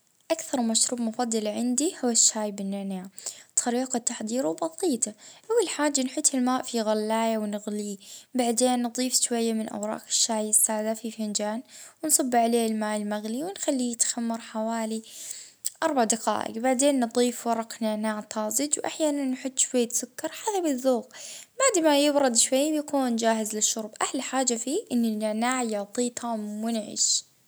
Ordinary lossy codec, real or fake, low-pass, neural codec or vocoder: none; real; none; none